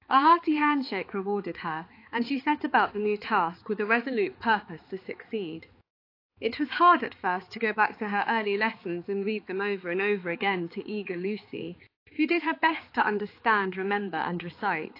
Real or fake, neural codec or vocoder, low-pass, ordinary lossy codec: fake; codec, 16 kHz, 4 kbps, X-Codec, HuBERT features, trained on balanced general audio; 5.4 kHz; AAC, 32 kbps